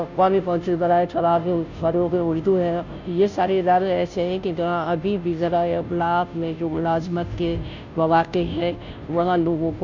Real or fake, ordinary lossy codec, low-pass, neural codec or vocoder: fake; none; 7.2 kHz; codec, 16 kHz, 0.5 kbps, FunCodec, trained on Chinese and English, 25 frames a second